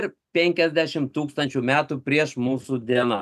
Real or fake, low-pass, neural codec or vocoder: real; 14.4 kHz; none